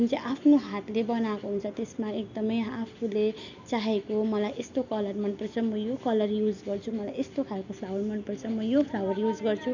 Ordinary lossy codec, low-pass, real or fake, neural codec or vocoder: none; 7.2 kHz; real; none